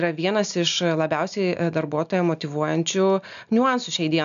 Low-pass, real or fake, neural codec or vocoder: 7.2 kHz; real; none